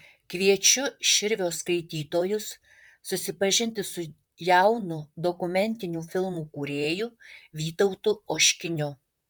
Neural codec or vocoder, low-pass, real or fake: vocoder, 44.1 kHz, 128 mel bands, Pupu-Vocoder; 19.8 kHz; fake